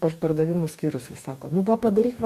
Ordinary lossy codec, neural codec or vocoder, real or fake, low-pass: AAC, 96 kbps; codec, 44.1 kHz, 2.6 kbps, DAC; fake; 14.4 kHz